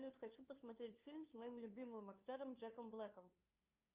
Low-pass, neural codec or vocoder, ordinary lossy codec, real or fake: 3.6 kHz; codec, 16 kHz, 2 kbps, FunCodec, trained on LibriTTS, 25 frames a second; Opus, 32 kbps; fake